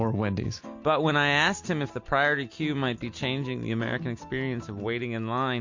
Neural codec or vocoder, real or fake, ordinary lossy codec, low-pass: none; real; MP3, 48 kbps; 7.2 kHz